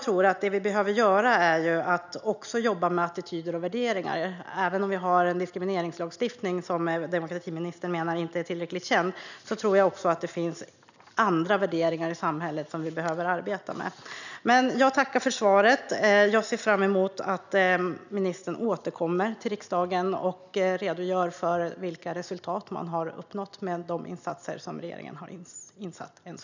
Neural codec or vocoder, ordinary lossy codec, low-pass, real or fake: none; none; 7.2 kHz; real